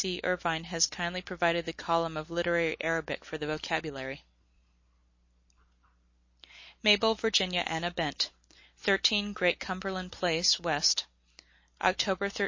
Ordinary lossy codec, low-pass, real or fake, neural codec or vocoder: MP3, 32 kbps; 7.2 kHz; real; none